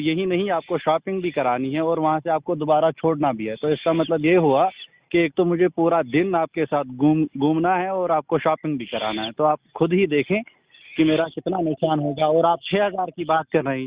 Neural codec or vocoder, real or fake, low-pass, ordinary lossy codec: none; real; 3.6 kHz; Opus, 64 kbps